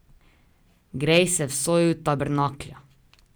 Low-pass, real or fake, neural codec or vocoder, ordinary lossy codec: none; real; none; none